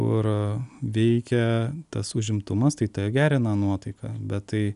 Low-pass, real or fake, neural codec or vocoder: 10.8 kHz; real; none